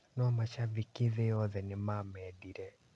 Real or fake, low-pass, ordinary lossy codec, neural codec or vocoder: real; none; none; none